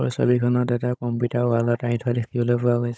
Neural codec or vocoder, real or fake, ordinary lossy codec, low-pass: codec, 16 kHz, 16 kbps, FunCodec, trained on Chinese and English, 50 frames a second; fake; none; none